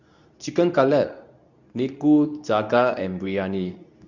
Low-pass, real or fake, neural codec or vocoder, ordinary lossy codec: 7.2 kHz; fake; codec, 24 kHz, 0.9 kbps, WavTokenizer, medium speech release version 2; none